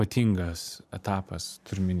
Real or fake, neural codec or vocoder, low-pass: fake; vocoder, 44.1 kHz, 128 mel bands every 512 samples, BigVGAN v2; 14.4 kHz